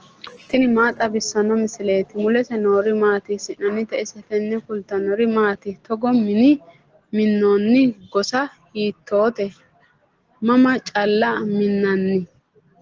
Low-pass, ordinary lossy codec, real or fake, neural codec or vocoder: 7.2 kHz; Opus, 16 kbps; real; none